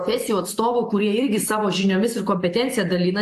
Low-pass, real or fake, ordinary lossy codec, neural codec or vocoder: 14.4 kHz; real; AAC, 64 kbps; none